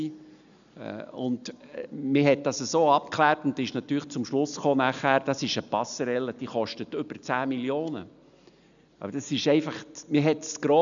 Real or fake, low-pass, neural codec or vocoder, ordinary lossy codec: real; 7.2 kHz; none; none